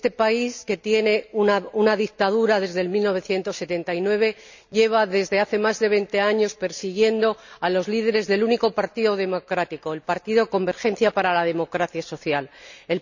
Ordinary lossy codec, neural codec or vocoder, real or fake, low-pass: none; none; real; 7.2 kHz